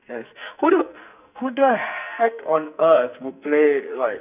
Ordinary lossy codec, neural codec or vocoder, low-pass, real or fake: none; codec, 44.1 kHz, 2.6 kbps, SNAC; 3.6 kHz; fake